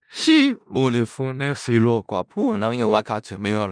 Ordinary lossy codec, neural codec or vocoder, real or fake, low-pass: none; codec, 16 kHz in and 24 kHz out, 0.4 kbps, LongCat-Audio-Codec, four codebook decoder; fake; 9.9 kHz